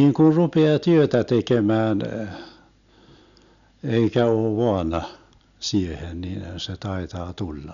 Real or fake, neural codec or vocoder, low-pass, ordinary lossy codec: real; none; 7.2 kHz; none